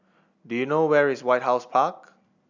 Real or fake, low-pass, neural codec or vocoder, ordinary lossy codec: real; 7.2 kHz; none; none